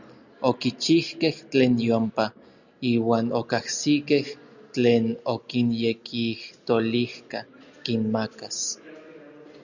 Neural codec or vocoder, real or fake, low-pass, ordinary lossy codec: none; real; 7.2 kHz; Opus, 64 kbps